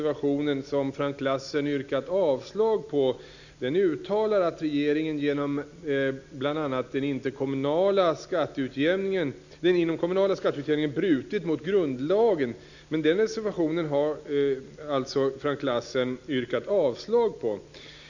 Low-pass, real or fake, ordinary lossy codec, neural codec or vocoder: 7.2 kHz; real; none; none